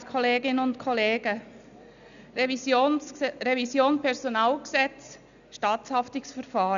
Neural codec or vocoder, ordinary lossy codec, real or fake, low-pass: none; none; real; 7.2 kHz